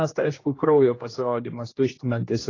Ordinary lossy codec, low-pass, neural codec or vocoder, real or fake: AAC, 32 kbps; 7.2 kHz; codec, 16 kHz, 1 kbps, X-Codec, HuBERT features, trained on general audio; fake